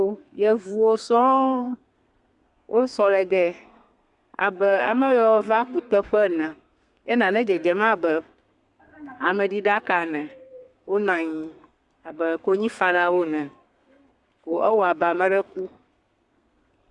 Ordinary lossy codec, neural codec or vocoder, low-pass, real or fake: Opus, 64 kbps; codec, 32 kHz, 1.9 kbps, SNAC; 10.8 kHz; fake